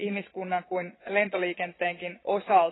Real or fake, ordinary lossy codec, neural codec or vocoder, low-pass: fake; AAC, 16 kbps; vocoder, 22.05 kHz, 80 mel bands, Vocos; 7.2 kHz